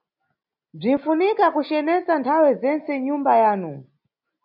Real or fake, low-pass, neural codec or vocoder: real; 5.4 kHz; none